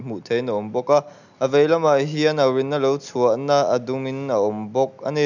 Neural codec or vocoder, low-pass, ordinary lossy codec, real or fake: none; 7.2 kHz; none; real